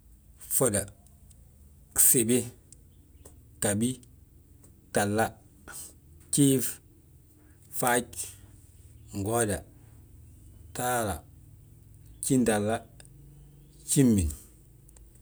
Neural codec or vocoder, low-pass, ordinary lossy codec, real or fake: none; none; none; real